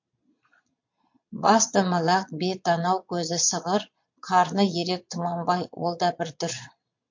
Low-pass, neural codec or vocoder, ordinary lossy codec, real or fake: 7.2 kHz; vocoder, 22.05 kHz, 80 mel bands, Vocos; MP3, 48 kbps; fake